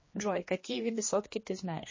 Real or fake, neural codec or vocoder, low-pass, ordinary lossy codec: fake; codec, 16 kHz, 2 kbps, X-Codec, HuBERT features, trained on general audio; 7.2 kHz; MP3, 32 kbps